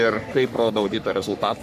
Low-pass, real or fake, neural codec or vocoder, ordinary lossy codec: 14.4 kHz; fake; codec, 44.1 kHz, 3.4 kbps, Pupu-Codec; AAC, 96 kbps